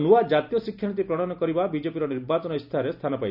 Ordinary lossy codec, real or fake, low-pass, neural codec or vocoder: none; real; 5.4 kHz; none